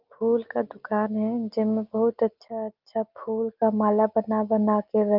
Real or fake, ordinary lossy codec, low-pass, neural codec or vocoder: real; Opus, 32 kbps; 5.4 kHz; none